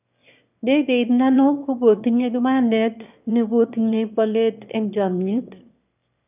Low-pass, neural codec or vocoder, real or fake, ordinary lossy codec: 3.6 kHz; autoencoder, 22.05 kHz, a latent of 192 numbers a frame, VITS, trained on one speaker; fake; none